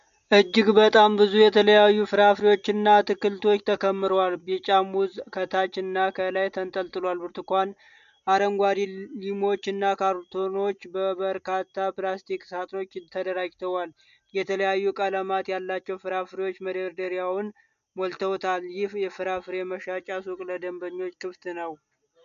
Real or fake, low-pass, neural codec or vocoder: real; 7.2 kHz; none